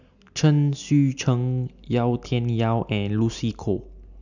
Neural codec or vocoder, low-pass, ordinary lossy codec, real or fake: none; 7.2 kHz; none; real